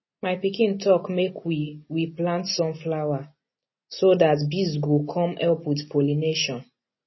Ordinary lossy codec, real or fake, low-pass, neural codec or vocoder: MP3, 24 kbps; real; 7.2 kHz; none